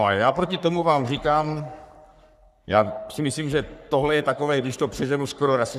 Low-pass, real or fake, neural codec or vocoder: 14.4 kHz; fake; codec, 44.1 kHz, 3.4 kbps, Pupu-Codec